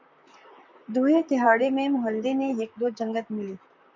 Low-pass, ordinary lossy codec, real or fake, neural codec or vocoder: 7.2 kHz; MP3, 64 kbps; fake; vocoder, 44.1 kHz, 128 mel bands, Pupu-Vocoder